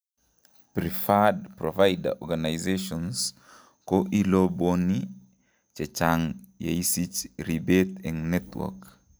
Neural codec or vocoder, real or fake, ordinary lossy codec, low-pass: none; real; none; none